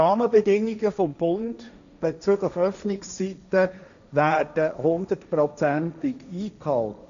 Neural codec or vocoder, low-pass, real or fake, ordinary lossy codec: codec, 16 kHz, 1.1 kbps, Voila-Tokenizer; 7.2 kHz; fake; none